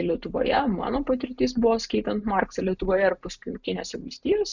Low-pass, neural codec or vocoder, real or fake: 7.2 kHz; none; real